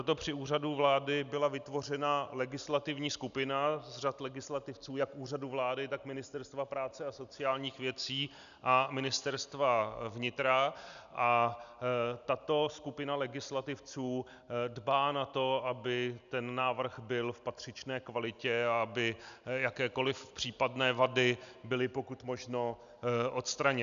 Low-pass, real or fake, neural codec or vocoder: 7.2 kHz; real; none